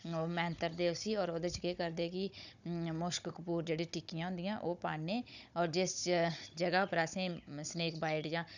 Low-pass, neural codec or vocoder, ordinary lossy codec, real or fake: 7.2 kHz; codec, 16 kHz, 16 kbps, FunCodec, trained on Chinese and English, 50 frames a second; none; fake